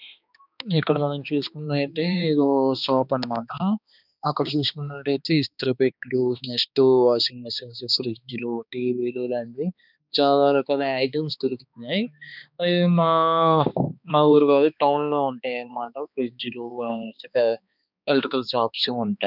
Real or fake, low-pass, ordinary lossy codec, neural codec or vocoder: fake; 5.4 kHz; none; codec, 16 kHz, 2 kbps, X-Codec, HuBERT features, trained on balanced general audio